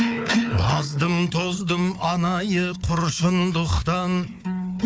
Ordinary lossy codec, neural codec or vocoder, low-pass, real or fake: none; codec, 16 kHz, 4 kbps, FunCodec, trained on Chinese and English, 50 frames a second; none; fake